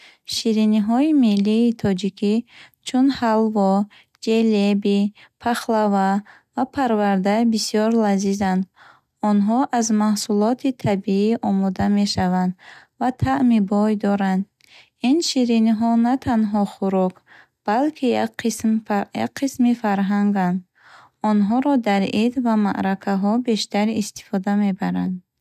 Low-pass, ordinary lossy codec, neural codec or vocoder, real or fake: 14.4 kHz; none; none; real